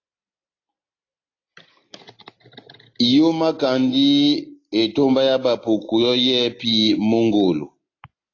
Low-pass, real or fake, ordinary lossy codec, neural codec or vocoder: 7.2 kHz; real; AAC, 32 kbps; none